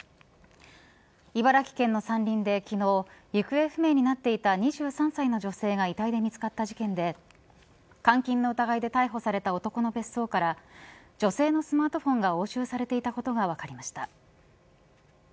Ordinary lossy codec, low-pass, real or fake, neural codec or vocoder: none; none; real; none